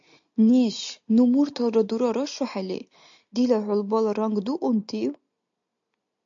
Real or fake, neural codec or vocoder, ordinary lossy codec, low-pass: real; none; AAC, 64 kbps; 7.2 kHz